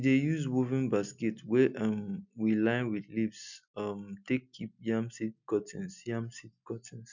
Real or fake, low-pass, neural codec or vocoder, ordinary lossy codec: real; 7.2 kHz; none; none